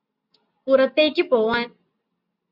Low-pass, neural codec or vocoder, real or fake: 5.4 kHz; none; real